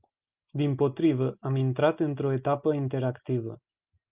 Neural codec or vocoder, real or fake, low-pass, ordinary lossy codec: none; real; 3.6 kHz; Opus, 24 kbps